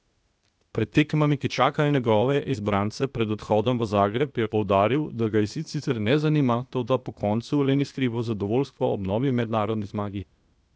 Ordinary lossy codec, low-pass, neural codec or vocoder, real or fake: none; none; codec, 16 kHz, 0.8 kbps, ZipCodec; fake